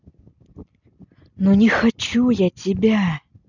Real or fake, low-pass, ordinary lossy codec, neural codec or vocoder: real; 7.2 kHz; none; none